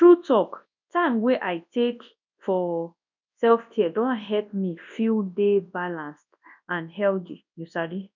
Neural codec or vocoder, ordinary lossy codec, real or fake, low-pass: codec, 24 kHz, 0.9 kbps, WavTokenizer, large speech release; none; fake; 7.2 kHz